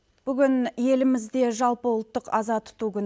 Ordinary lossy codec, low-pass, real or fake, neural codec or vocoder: none; none; real; none